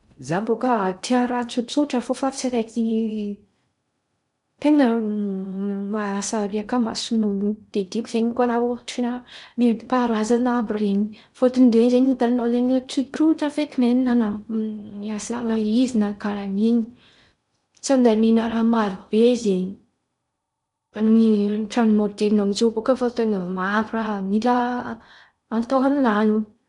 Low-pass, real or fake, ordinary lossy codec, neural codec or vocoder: 10.8 kHz; fake; none; codec, 16 kHz in and 24 kHz out, 0.6 kbps, FocalCodec, streaming, 2048 codes